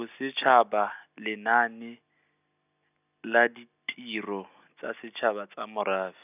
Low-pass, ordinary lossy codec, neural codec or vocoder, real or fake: 3.6 kHz; none; none; real